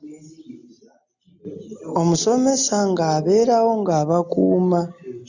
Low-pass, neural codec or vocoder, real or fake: 7.2 kHz; none; real